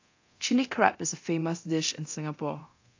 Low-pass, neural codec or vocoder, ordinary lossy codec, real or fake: 7.2 kHz; codec, 24 kHz, 0.9 kbps, DualCodec; AAC, 48 kbps; fake